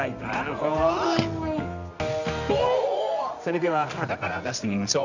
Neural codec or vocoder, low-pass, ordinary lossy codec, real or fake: codec, 24 kHz, 0.9 kbps, WavTokenizer, medium music audio release; 7.2 kHz; none; fake